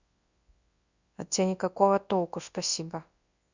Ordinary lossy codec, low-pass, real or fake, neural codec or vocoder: Opus, 64 kbps; 7.2 kHz; fake; codec, 24 kHz, 0.9 kbps, WavTokenizer, large speech release